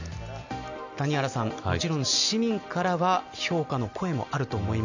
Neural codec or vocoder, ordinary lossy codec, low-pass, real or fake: none; none; 7.2 kHz; real